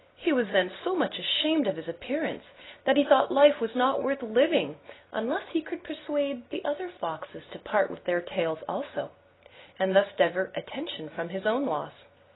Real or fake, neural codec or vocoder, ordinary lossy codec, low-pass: real; none; AAC, 16 kbps; 7.2 kHz